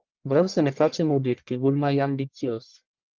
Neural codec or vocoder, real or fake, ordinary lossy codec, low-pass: codec, 44.1 kHz, 1.7 kbps, Pupu-Codec; fake; Opus, 32 kbps; 7.2 kHz